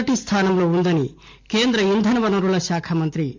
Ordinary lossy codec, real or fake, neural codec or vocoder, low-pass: MP3, 48 kbps; real; none; 7.2 kHz